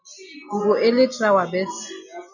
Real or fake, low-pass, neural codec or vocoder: real; 7.2 kHz; none